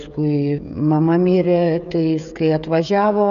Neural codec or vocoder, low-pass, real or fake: codec, 16 kHz, 16 kbps, FreqCodec, smaller model; 7.2 kHz; fake